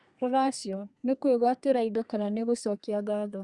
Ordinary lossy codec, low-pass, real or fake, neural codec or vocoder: Opus, 64 kbps; 10.8 kHz; fake; codec, 32 kHz, 1.9 kbps, SNAC